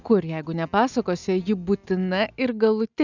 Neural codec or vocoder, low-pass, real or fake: none; 7.2 kHz; real